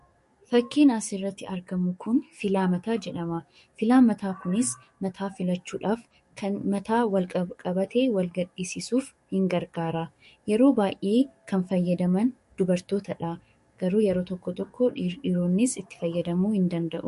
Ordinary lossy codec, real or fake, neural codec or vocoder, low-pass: MP3, 48 kbps; fake; codec, 44.1 kHz, 7.8 kbps, DAC; 14.4 kHz